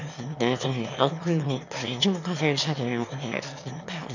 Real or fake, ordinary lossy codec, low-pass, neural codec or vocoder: fake; none; 7.2 kHz; autoencoder, 22.05 kHz, a latent of 192 numbers a frame, VITS, trained on one speaker